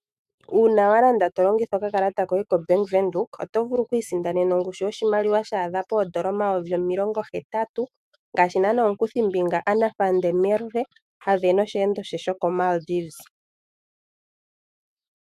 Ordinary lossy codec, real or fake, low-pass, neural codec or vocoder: AAC, 96 kbps; fake; 14.4 kHz; autoencoder, 48 kHz, 128 numbers a frame, DAC-VAE, trained on Japanese speech